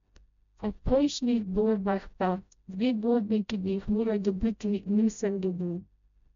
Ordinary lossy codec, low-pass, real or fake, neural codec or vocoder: none; 7.2 kHz; fake; codec, 16 kHz, 0.5 kbps, FreqCodec, smaller model